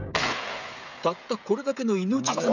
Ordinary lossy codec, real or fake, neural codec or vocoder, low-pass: none; fake; codec, 16 kHz, 16 kbps, FreqCodec, smaller model; 7.2 kHz